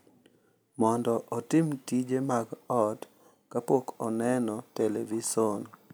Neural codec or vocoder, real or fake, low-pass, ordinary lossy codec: none; real; none; none